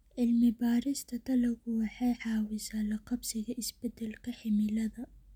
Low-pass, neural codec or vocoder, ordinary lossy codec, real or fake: 19.8 kHz; none; none; real